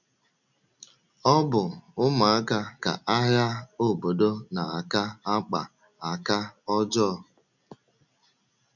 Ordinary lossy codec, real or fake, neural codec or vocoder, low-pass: none; real; none; 7.2 kHz